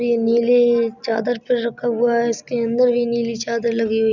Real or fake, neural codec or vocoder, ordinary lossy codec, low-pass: real; none; none; 7.2 kHz